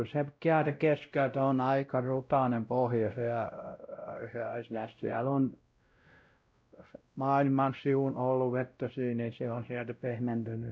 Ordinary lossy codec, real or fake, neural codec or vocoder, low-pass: none; fake; codec, 16 kHz, 0.5 kbps, X-Codec, WavLM features, trained on Multilingual LibriSpeech; none